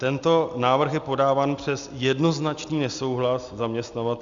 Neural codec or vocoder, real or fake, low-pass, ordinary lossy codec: none; real; 7.2 kHz; Opus, 64 kbps